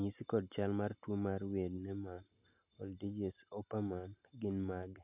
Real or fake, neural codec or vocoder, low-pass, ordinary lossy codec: real; none; 3.6 kHz; none